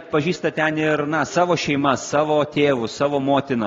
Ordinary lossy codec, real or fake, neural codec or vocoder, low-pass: AAC, 32 kbps; real; none; 7.2 kHz